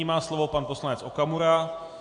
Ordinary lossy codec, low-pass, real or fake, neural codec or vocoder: AAC, 64 kbps; 9.9 kHz; real; none